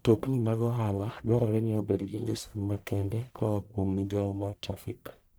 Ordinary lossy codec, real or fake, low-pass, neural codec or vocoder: none; fake; none; codec, 44.1 kHz, 1.7 kbps, Pupu-Codec